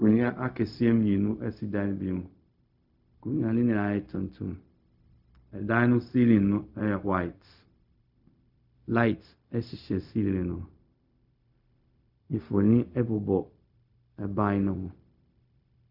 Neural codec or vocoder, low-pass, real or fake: codec, 16 kHz, 0.4 kbps, LongCat-Audio-Codec; 5.4 kHz; fake